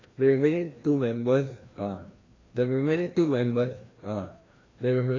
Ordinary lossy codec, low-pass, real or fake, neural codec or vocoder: AAC, 32 kbps; 7.2 kHz; fake; codec, 16 kHz, 1 kbps, FreqCodec, larger model